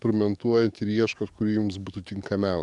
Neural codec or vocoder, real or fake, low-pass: codec, 44.1 kHz, 7.8 kbps, DAC; fake; 10.8 kHz